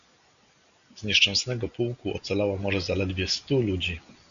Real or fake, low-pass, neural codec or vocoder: real; 7.2 kHz; none